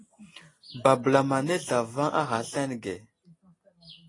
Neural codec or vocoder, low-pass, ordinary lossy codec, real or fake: none; 10.8 kHz; AAC, 32 kbps; real